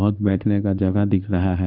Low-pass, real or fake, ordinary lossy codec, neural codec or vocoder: 5.4 kHz; fake; none; codec, 16 kHz in and 24 kHz out, 1 kbps, XY-Tokenizer